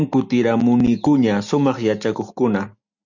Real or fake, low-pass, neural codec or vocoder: real; 7.2 kHz; none